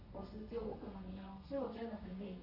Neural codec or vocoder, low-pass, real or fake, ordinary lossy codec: codec, 32 kHz, 1.9 kbps, SNAC; 5.4 kHz; fake; none